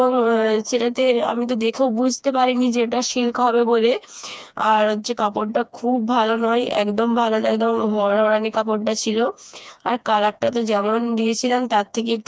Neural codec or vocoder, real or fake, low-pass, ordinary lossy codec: codec, 16 kHz, 2 kbps, FreqCodec, smaller model; fake; none; none